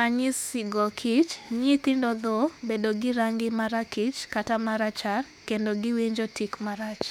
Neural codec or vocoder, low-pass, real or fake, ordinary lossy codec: autoencoder, 48 kHz, 32 numbers a frame, DAC-VAE, trained on Japanese speech; 19.8 kHz; fake; none